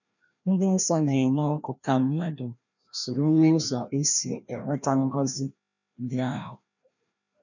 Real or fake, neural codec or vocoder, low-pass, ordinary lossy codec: fake; codec, 16 kHz, 1 kbps, FreqCodec, larger model; 7.2 kHz; none